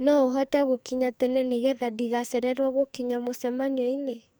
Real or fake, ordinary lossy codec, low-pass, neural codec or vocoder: fake; none; none; codec, 44.1 kHz, 2.6 kbps, SNAC